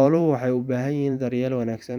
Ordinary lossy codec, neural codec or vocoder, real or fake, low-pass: none; vocoder, 44.1 kHz, 128 mel bands every 512 samples, BigVGAN v2; fake; 19.8 kHz